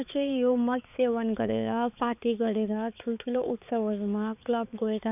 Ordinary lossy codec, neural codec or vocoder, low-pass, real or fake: none; codec, 16 kHz, 4 kbps, X-Codec, HuBERT features, trained on balanced general audio; 3.6 kHz; fake